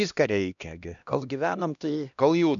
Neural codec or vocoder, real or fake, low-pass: codec, 16 kHz, 1 kbps, X-Codec, HuBERT features, trained on LibriSpeech; fake; 7.2 kHz